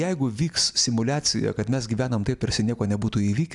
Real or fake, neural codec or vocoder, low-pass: real; none; 10.8 kHz